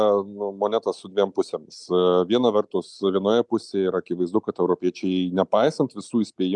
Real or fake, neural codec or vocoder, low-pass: real; none; 10.8 kHz